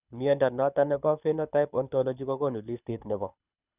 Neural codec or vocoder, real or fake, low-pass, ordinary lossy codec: codec, 24 kHz, 6 kbps, HILCodec; fake; 3.6 kHz; none